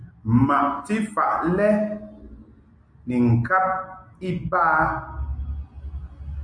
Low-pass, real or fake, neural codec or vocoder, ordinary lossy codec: 9.9 kHz; real; none; MP3, 48 kbps